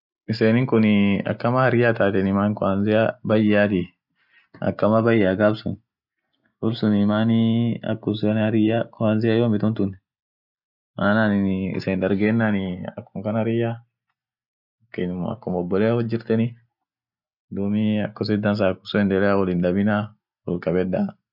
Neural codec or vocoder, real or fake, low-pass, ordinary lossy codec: none; real; 5.4 kHz; none